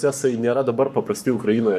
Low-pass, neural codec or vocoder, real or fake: 14.4 kHz; codec, 44.1 kHz, 7.8 kbps, Pupu-Codec; fake